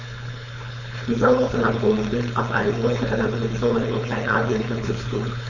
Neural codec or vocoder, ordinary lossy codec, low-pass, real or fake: codec, 16 kHz, 4.8 kbps, FACodec; none; 7.2 kHz; fake